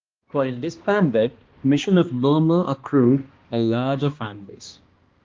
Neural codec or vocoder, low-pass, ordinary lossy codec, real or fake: codec, 16 kHz, 1 kbps, X-Codec, HuBERT features, trained on balanced general audio; 7.2 kHz; Opus, 24 kbps; fake